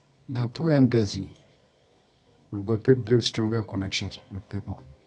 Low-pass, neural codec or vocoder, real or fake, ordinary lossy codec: 10.8 kHz; codec, 24 kHz, 0.9 kbps, WavTokenizer, medium music audio release; fake; none